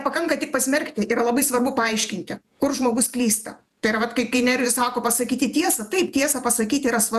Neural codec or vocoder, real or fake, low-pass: vocoder, 48 kHz, 128 mel bands, Vocos; fake; 14.4 kHz